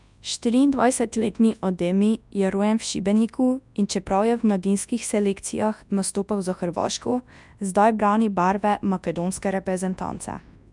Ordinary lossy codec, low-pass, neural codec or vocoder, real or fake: none; 10.8 kHz; codec, 24 kHz, 0.9 kbps, WavTokenizer, large speech release; fake